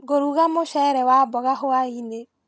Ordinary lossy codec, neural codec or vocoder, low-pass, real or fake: none; none; none; real